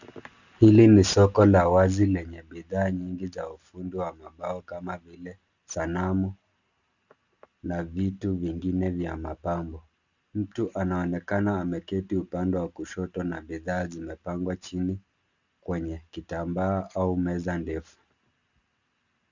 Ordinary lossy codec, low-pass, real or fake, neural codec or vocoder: Opus, 64 kbps; 7.2 kHz; real; none